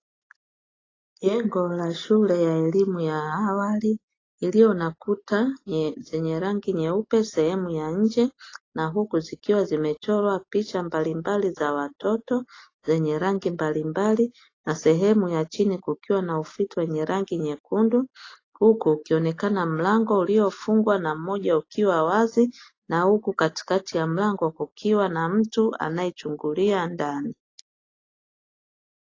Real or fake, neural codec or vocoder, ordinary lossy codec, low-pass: real; none; AAC, 32 kbps; 7.2 kHz